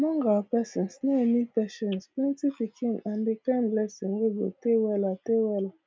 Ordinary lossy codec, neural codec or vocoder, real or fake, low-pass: none; none; real; none